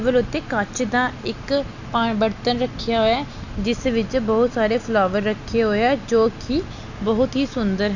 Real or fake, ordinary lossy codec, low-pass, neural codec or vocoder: real; none; 7.2 kHz; none